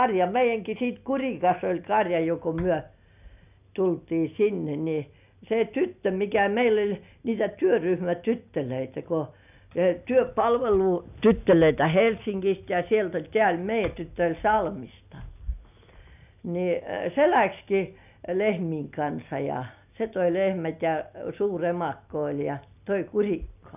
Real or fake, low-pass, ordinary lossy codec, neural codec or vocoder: real; 3.6 kHz; none; none